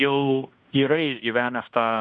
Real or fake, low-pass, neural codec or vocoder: fake; 9.9 kHz; codec, 16 kHz in and 24 kHz out, 0.9 kbps, LongCat-Audio-Codec, fine tuned four codebook decoder